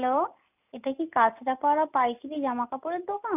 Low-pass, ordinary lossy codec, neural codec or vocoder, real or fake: 3.6 kHz; none; none; real